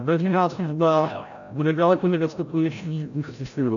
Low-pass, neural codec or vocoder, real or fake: 7.2 kHz; codec, 16 kHz, 0.5 kbps, FreqCodec, larger model; fake